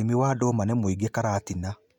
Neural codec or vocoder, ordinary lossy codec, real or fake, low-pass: none; none; real; 19.8 kHz